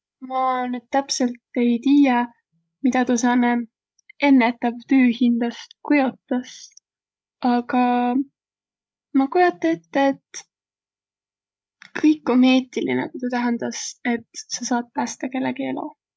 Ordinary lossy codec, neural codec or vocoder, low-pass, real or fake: none; codec, 16 kHz, 16 kbps, FreqCodec, larger model; none; fake